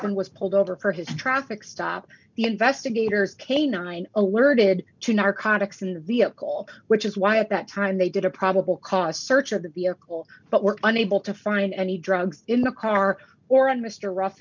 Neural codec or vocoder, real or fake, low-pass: none; real; 7.2 kHz